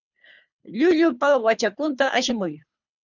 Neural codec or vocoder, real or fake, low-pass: codec, 24 kHz, 3 kbps, HILCodec; fake; 7.2 kHz